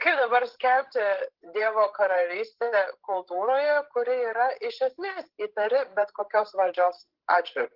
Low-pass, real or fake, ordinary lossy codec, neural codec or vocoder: 5.4 kHz; fake; Opus, 16 kbps; codec, 16 kHz, 16 kbps, FreqCodec, larger model